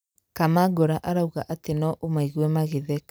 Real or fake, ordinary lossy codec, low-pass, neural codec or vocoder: real; none; none; none